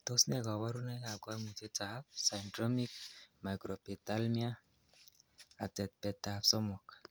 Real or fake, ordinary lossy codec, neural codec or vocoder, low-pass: real; none; none; none